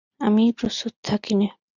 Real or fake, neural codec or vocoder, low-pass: real; none; 7.2 kHz